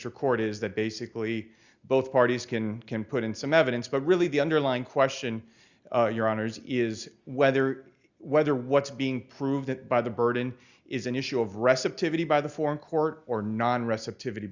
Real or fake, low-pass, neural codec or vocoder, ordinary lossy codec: real; 7.2 kHz; none; Opus, 64 kbps